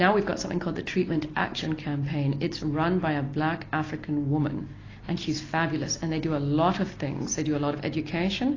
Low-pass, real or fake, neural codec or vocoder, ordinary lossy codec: 7.2 kHz; real; none; AAC, 32 kbps